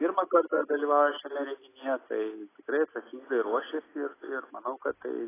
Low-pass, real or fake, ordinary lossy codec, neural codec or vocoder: 3.6 kHz; real; AAC, 16 kbps; none